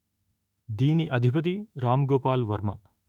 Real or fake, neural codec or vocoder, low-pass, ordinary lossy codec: fake; autoencoder, 48 kHz, 32 numbers a frame, DAC-VAE, trained on Japanese speech; 19.8 kHz; none